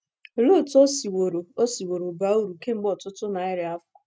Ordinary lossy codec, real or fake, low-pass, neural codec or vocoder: none; real; none; none